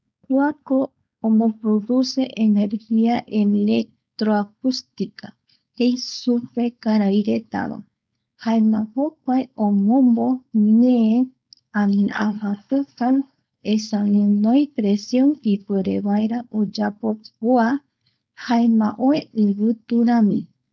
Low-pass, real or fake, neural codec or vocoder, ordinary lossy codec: none; fake; codec, 16 kHz, 4.8 kbps, FACodec; none